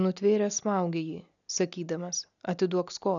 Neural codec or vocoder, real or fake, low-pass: none; real; 7.2 kHz